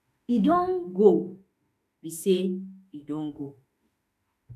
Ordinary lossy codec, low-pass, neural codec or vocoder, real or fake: none; 14.4 kHz; autoencoder, 48 kHz, 32 numbers a frame, DAC-VAE, trained on Japanese speech; fake